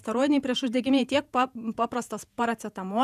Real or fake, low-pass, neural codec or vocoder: fake; 14.4 kHz; vocoder, 48 kHz, 128 mel bands, Vocos